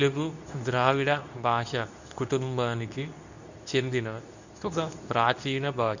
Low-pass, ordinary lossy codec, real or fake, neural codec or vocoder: 7.2 kHz; none; fake; codec, 24 kHz, 0.9 kbps, WavTokenizer, medium speech release version 2